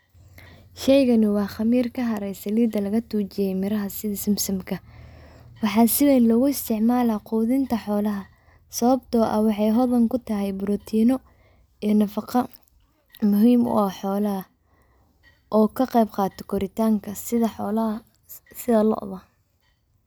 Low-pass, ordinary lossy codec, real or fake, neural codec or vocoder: none; none; real; none